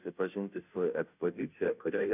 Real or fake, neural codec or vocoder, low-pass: fake; codec, 16 kHz, 0.5 kbps, FunCodec, trained on Chinese and English, 25 frames a second; 3.6 kHz